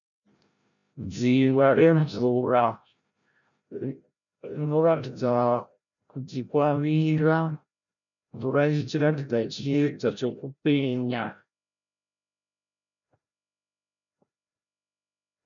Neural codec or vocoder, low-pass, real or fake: codec, 16 kHz, 0.5 kbps, FreqCodec, larger model; 7.2 kHz; fake